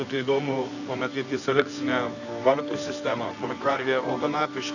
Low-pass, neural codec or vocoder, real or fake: 7.2 kHz; codec, 24 kHz, 0.9 kbps, WavTokenizer, medium music audio release; fake